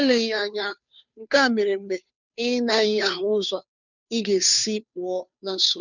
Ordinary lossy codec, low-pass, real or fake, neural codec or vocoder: none; 7.2 kHz; fake; codec, 16 kHz, 2 kbps, FunCodec, trained on Chinese and English, 25 frames a second